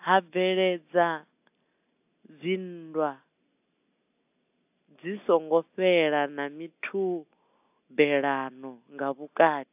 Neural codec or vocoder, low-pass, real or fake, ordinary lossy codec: none; 3.6 kHz; real; AAC, 32 kbps